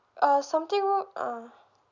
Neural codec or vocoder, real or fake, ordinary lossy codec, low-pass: none; real; none; 7.2 kHz